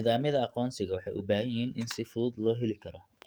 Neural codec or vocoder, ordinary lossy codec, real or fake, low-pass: codec, 44.1 kHz, 7.8 kbps, DAC; none; fake; none